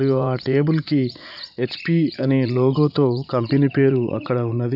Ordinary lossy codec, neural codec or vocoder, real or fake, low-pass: none; vocoder, 44.1 kHz, 80 mel bands, Vocos; fake; 5.4 kHz